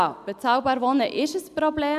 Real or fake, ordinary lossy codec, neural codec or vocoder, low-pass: real; none; none; 14.4 kHz